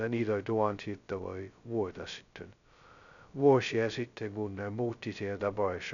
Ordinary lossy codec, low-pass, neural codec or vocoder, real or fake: none; 7.2 kHz; codec, 16 kHz, 0.2 kbps, FocalCodec; fake